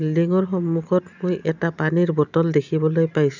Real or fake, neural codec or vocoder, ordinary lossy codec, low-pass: real; none; none; 7.2 kHz